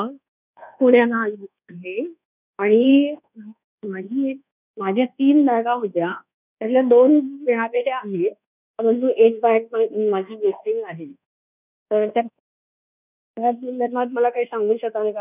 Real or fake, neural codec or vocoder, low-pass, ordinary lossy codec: fake; codec, 24 kHz, 1.2 kbps, DualCodec; 3.6 kHz; none